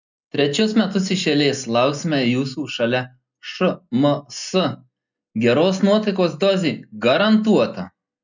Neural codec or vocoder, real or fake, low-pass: none; real; 7.2 kHz